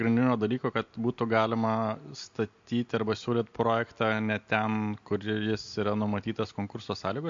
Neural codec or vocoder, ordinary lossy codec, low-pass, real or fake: none; MP3, 48 kbps; 7.2 kHz; real